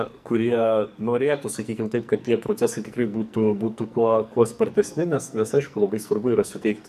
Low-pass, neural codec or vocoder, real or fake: 14.4 kHz; codec, 44.1 kHz, 2.6 kbps, SNAC; fake